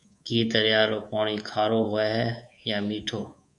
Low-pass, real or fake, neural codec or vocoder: 10.8 kHz; fake; codec, 24 kHz, 3.1 kbps, DualCodec